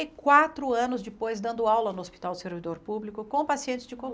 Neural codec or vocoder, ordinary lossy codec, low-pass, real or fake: none; none; none; real